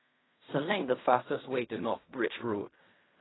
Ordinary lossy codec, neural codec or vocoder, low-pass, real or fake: AAC, 16 kbps; codec, 16 kHz in and 24 kHz out, 0.4 kbps, LongCat-Audio-Codec, fine tuned four codebook decoder; 7.2 kHz; fake